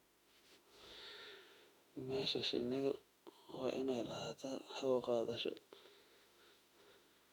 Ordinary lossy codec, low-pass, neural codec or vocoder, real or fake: MP3, 96 kbps; 19.8 kHz; autoencoder, 48 kHz, 32 numbers a frame, DAC-VAE, trained on Japanese speech; fake